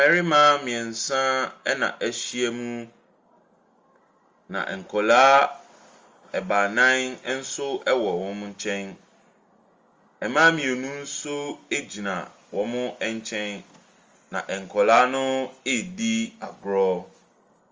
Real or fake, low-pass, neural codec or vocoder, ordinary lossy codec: real; 7.2 kHz; none; Opus, 32 kbps